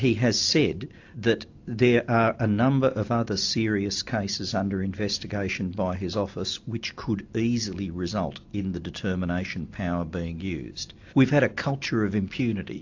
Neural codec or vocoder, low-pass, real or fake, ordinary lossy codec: none; 7.2 kHz; real; AAC, 48 kbps